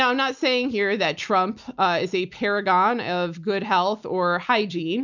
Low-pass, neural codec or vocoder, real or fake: 7.2 kHz; none; real